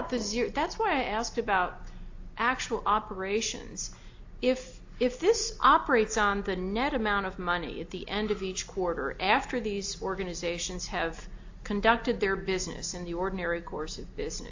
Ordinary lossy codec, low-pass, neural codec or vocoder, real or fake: AAC, 48 kbps; 7.2 kHz; none; real